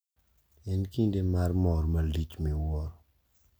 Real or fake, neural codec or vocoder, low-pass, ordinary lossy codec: real; none; none; none